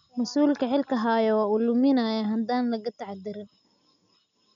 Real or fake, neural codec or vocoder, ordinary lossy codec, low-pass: real; none; none; 7.2 kHz